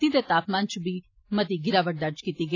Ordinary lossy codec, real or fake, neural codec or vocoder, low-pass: AAC, 32 kbps; real; none; 7.2 kHz